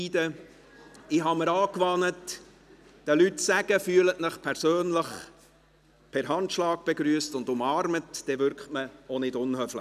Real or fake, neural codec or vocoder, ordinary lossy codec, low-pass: real; none; none; 14.4 kHz